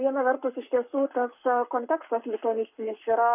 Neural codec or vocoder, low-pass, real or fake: codec, 44.1 kHz, 7.8 kbps, Pupu-Codec; 3.6 kHz; fake